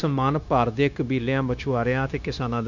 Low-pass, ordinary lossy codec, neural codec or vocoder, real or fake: 7.2 kHz; none; codec, 16 kHz, 0.9 kbps, LongCat-Audio-Codec; fake